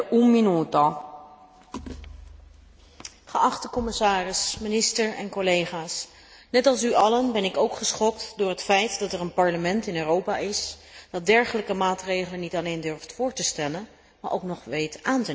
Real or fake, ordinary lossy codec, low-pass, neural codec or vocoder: real; none; none; none